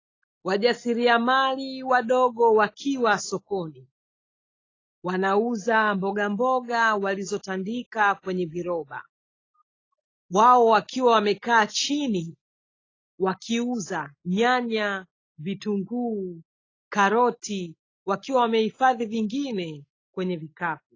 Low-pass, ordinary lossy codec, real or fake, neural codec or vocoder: 7.2 kHz; AAC, 32 kbps; real; none